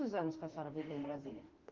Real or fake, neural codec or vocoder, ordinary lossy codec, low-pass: fake; autoencoder, 48 kHz, 32 numbers a frame, DAC-VAE, trained on Japanese speech; Opus, 16 kbps; 7.2 kHz